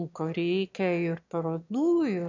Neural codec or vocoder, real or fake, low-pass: autoencoder, 22.05 kHz, a latent of 192 numbers a frame, VITS, trained on one speaker; fake; 7.2 kHz